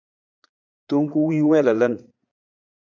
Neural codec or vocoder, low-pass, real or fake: vocoder, 22.05 kHz, 80 mel bands, Vocos; 7.2 kHz; fake